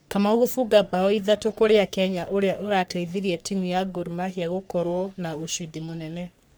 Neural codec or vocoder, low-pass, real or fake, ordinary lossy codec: codec, 44.1 kHz, 3.4 kbps, Pupu-Codec; none; fake; none